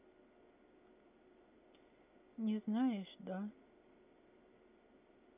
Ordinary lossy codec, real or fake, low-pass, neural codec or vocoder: none; real; 3.6 kHz; none